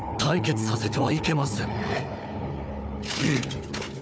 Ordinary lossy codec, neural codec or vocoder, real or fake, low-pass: none; codec, 16 kHz, 4 kbps, FunCodec, trained on Chinese and English, 50 frames a second; fake; none